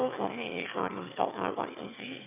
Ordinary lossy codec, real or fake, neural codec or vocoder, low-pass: none; fake; autoencoder, 22.05 kHz, a latent of 192 numbers a frame, VITS, trained on one speaker; 3.6 kHz